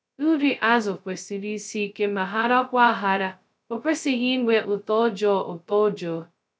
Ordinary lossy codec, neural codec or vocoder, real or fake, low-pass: none; codec, 16 kHz, 0.2 kbps, FocalCodec; fake; none